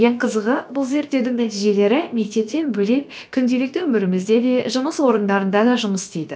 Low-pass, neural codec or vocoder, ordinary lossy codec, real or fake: none; codec, 16 kHz, about 1 kbps, DyCAST, with the encoder's durations; none; fake